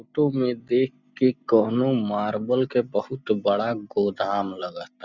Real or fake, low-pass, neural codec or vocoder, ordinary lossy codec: real; 7.2 kHz; none; none